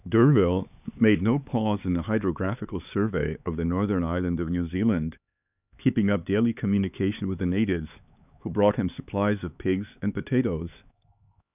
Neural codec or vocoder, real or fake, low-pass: codec, 16 kHz, 4 kbps, X-Codec, HuBERT features, trained on LibriSpeech; fake; 3.6 kHz